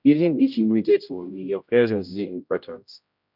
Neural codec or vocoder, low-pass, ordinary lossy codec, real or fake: codec, 16 kHz, 0.5 kbps, X-Codec, HuBERT features, trained on general audio; 5.4 kHz; none; fake